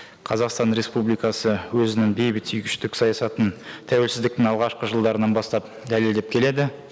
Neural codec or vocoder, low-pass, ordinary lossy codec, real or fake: none; none; none; real